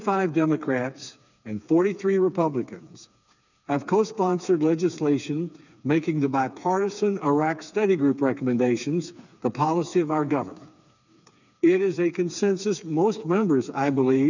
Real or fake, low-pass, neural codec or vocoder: fake; 7.2 kHz; codec, 16 kHz, 4 kbps, FreqCodec, smaller model